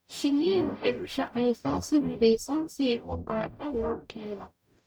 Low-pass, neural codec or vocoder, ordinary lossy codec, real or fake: none; codec, 44.1 kHz, 0.9 kbps, DAC; none; fake